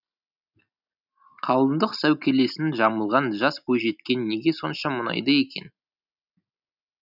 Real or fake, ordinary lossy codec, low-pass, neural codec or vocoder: real; none; 5.4 kHz; none